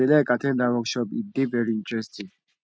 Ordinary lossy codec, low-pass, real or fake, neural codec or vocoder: none; none; real; none